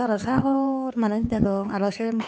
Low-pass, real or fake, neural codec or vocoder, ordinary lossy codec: none; fake; codec, 16 kHz, 4 kbps, X-Codec, HuBERT features, trained on LibriSpeech; none